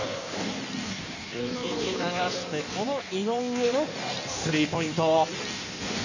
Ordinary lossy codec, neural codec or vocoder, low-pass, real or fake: none; codec, 16 kHz in and 24 kHz out, 1.1 kbps, FireRedTTS-2 codec; 7.2 kHz; fake